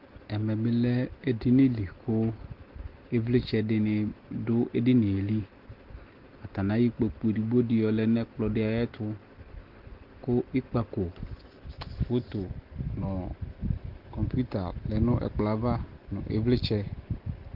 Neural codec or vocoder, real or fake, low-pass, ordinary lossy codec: none; real; 5.4 kHz; Opus, 16 kbps